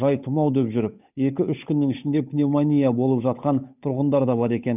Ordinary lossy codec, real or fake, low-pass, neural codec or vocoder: none; fake; 3.6 kHz; codec, 16 kHz, 8 kbps, FunCodec, trained on Chinese and English, 25 frames a second